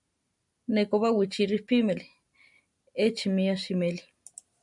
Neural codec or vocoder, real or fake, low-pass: none; real; 10.8 kHz